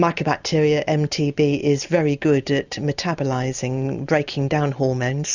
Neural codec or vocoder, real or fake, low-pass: none; real; 7.2 kHz